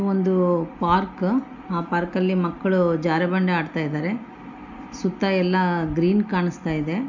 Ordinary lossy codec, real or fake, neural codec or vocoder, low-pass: none; real; none; 7.2 kHz